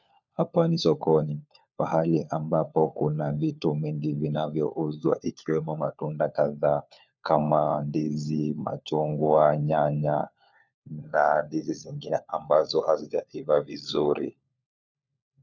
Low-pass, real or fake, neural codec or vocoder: 7.2 kHz; fake; codec, 16 kHz, 4 kbps, FunCodec, trained on LibriTTS, 50 frames a second